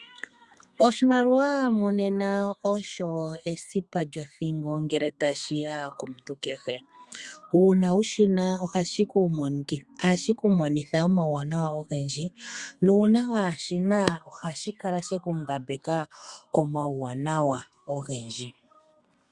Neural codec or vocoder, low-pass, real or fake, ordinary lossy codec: codec, 32 kHz, 1.9 kbps, SNAC; 10.8 kHz; fake; Opus, 64 kbps